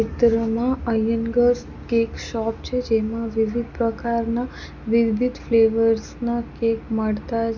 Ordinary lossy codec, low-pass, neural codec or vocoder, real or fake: none; 7.2 kHz; none; real